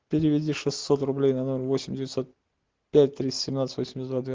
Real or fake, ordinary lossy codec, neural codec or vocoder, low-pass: real; Opus, 16 kbps; none; 7.2 kHz